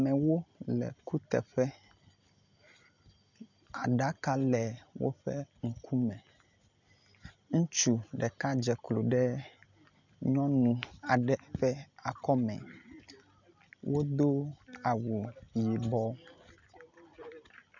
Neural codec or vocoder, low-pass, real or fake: none; 7.2 kHz; real